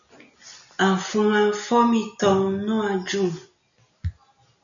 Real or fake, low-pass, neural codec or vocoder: real; 7.2 kHz; none